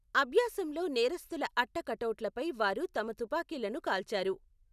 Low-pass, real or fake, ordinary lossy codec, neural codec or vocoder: 14.4 kHz; real; none; none